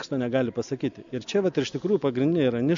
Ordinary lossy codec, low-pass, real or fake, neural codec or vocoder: MP3, 64 kbps; 7.2 kHz; real; none